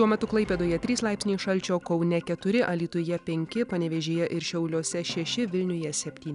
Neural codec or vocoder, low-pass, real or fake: none; 10.8 kHz; real